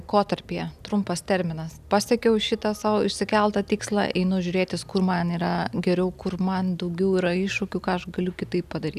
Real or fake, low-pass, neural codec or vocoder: real; 14.4 kHz; none